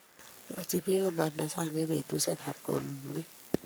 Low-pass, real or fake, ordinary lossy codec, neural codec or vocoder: none; fake; none; codec, 44.1 kHz, 3.4 kbps, Pupu-Codec